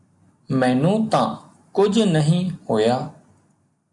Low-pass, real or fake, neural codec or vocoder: 10.8 kHz; real; none